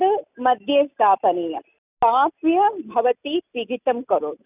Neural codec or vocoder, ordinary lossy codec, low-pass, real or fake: none; none; 3.6 kHz; real